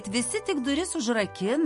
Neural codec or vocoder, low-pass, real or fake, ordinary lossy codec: none; 14.4 kHz; real; MP3, 48 kbps